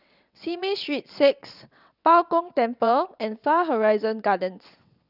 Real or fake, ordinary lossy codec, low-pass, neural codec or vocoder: fake; none; 5.4 kHz; vocoder, 22.05 kHz, 80 mel bands, WaveNeXt